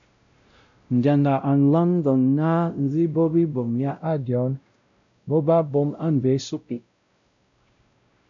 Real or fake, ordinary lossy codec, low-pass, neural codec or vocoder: fake; AAC, 64 kbps; 7.2 kHz; codec, 16 kHz, 0.5 kbps, X-Codec, WavLM features, trained on Multilingual LibriSpeech